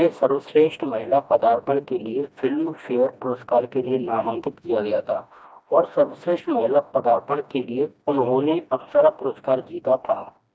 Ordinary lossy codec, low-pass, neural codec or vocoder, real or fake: none; none; codec, 16 kHz, 1 kbps, FreqCodec, smaller model; fake